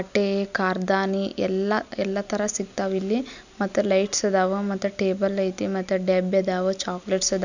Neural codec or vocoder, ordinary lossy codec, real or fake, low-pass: none; none; real; 7.2 kHz